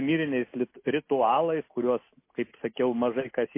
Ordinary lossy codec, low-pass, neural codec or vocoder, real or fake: MP3, 24 kbps; 3.6 kHz; none; real